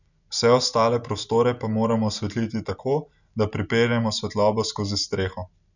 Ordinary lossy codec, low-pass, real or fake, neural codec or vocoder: none; 7.2 kHz; real; none